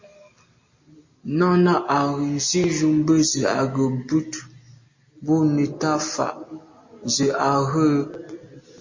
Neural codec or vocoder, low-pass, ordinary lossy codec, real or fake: none; 7.2 kHz; MP3, 32 kbps; real